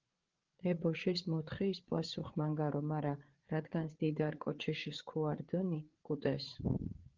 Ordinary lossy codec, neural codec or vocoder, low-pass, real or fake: Opus, 16 kbps; codec, 16 kHz, 8 kbps, FreqCodec, larger model; 7.2 kHz; fake